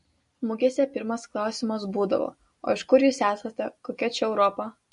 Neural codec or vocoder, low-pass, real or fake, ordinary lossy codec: none; 10.8 kHz; real; MP3, 48 kbps